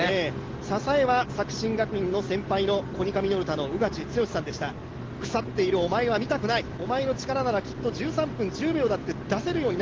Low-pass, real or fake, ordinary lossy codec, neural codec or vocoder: 7.2 kHz; real; Opus, 16 kbps; none